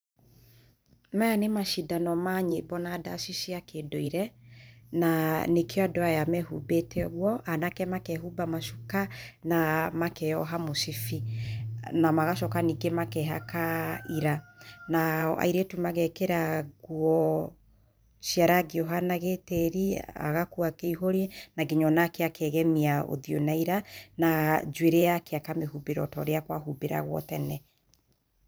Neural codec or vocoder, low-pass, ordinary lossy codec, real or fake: vocoder, 44.1 kHz, 128 mel bands every 512 samples, BigVGAN v2; none; none; fake